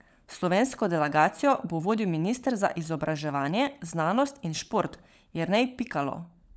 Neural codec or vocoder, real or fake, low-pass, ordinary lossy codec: codec, 16 kHz, 16 kbps, FunCodec, trained on LibriTTS, 50 frames a second; fake; none; none